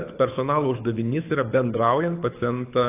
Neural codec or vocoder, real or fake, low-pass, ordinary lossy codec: codec, 24 kHz, 6 kbps, HILCodec; fake; 3.6 kHz; AAC, 24 kbps